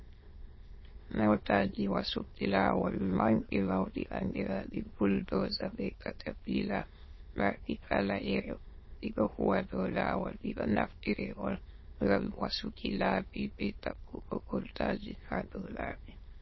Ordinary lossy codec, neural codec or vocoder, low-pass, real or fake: MP3, 24 kbps; autoencoder, 22.05 kHz, a latent of 192 numbers a frame, VITS, trained on many speakers; 7.2 kHz; fake